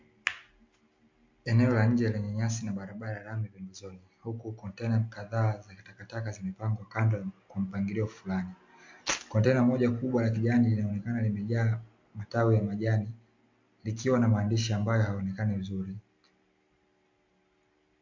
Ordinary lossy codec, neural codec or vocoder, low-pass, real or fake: MP3, 48 kbps; none; 7.2 kHz; real